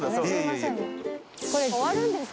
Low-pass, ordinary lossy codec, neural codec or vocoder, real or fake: none; none; none; real